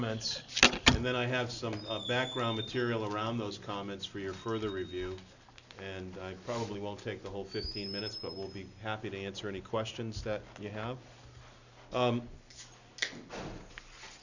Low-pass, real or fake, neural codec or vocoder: 7.2 kHz; real; none